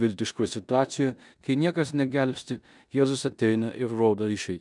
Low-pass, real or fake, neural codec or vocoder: 10.8 kHz; fake; codec, 16 kHz in and 24 kHz out, 0.9 kbps, LongCat-Audio-Codec, four codebook decoder